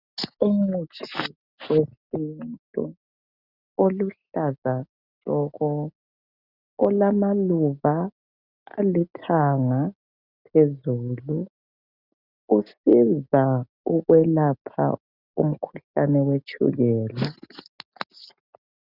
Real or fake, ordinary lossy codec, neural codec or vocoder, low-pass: real; Opus, 64 kbps; none; 5.4 kHz